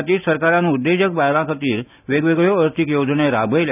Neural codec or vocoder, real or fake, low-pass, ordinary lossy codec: none; real; 3.6 kHz; none